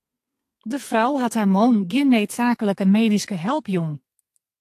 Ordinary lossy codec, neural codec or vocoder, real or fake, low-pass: AAC, 64 kbps; codec, 32 kHz, 1.9 kbps, SNAC; fake; 14.4 kHz